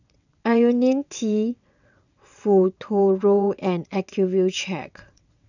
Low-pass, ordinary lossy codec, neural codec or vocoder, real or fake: 7.2 kHz; none; vocoder, 22.05 kHz, 80 mel bands, WaveNeXt; fake